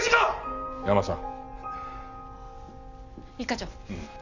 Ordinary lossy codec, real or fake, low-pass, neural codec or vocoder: none; real; 7.2 kHz; none